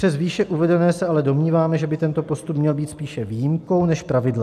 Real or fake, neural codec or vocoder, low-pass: real; none; 14.4 kHz